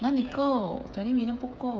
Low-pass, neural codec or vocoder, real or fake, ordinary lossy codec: none; codec, 16 kHz, 8 kbps, FreqCodec, smaller model; fake; none